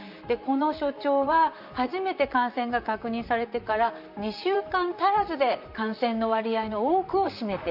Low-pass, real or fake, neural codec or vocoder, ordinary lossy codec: 5.4 kHz; fake; vocoder, 44.1 kHz, 128 mel bands, Pupu-Vocoder; none